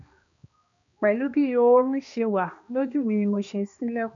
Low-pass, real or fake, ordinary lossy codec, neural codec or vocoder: 7.2 kHz; fake; none; codec, 16 kHz, 2 kbps, X-Codec, HuBERT features, trained on balanced general audio